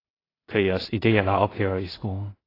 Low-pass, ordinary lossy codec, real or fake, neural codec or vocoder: 5.4 kHz; AAC, 24 kbps; fake; codec, 16 kHz in and 24 kHz out, 0.4 kbps, LongCat-Audio-Codec, two codebook decoder